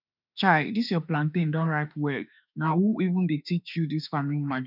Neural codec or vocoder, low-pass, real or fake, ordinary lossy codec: autoencoder, 48 kHz, 32 numbers a frame, DAC-VAE, trained on Japanese speech; 5.4 kHz; fake; none